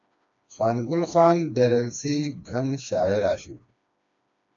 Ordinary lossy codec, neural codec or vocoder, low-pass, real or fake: AAC, 64 kbps; codec, 16 kHz, 2 kbps, FreqCodec, smaller model; 7.2 kHz; fake